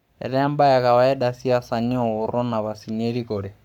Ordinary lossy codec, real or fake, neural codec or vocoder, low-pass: none; fake; codec, 44.1 kHz, 7.8 kbps, Pupu-Codec; 19.8 kHz